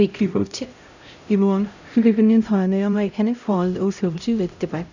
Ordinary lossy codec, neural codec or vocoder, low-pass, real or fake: none; codec, 16 kHz, 0.5 kbps, X-Codec, HuBERT features, trained on LibriSpeech; 7.2 kHz; fake